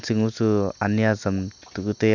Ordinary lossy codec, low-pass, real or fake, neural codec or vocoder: none; 7.2 kHz; real; none